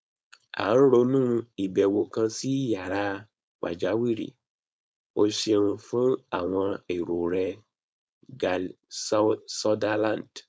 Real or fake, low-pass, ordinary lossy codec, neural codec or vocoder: fake; none; none; codec, 16 kHz, 4.8 kbps, FACodec